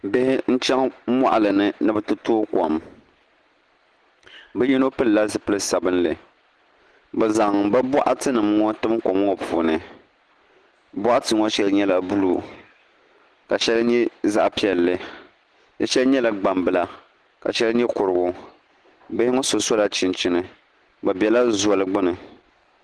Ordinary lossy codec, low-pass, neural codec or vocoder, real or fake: Opus, 16 kbps; 10.8 kHz; none; real